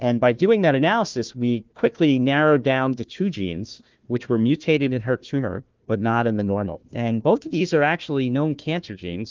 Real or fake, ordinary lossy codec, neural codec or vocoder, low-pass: fake; Opus, 32 kbps; codec, 16 kHz, 1 kbps, FunCodec, trained on Chinese and English, 50 frames a second; 7.2 kHz